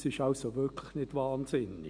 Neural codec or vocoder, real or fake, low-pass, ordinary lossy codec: none; real; 9.9 kHz; none